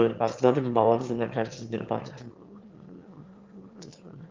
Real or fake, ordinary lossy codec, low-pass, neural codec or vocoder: fake; Opus, 32 kbps; 7.2 kHz; autoencoder, 22.05 kHz, a latent of 192 numbers a frame, VITS, trained on one speaker